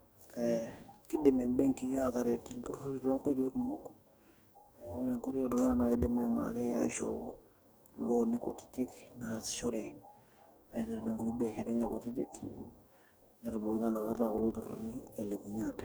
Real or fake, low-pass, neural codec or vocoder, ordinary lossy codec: fake; none; codec, 44.1 kHz, 2.6 kbps, DAC; none